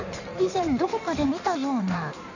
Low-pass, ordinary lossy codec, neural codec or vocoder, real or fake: 7.2 kHz; none; codec, 16 kHz in and 24 kHz out, 1.1 kbps, FireRedTTS-2 codec; fake